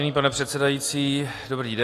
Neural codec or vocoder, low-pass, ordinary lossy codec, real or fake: none; 14.4 kHz; AAC, 48 kbps; real